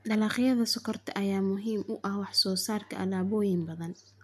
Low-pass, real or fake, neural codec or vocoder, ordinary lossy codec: 14.4 kHz; real; none; MP3, 96 kbps